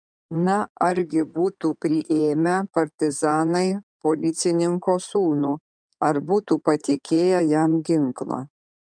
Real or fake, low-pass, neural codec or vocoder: fake; 9.9 kHz; codec, 16 kHz in and 24 kHz out, 2.2 kbps, FireRedTTS-2 codec